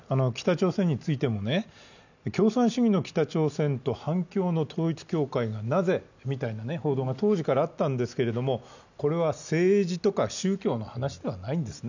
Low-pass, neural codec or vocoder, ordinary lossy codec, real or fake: 7.2 kHz; none; none; real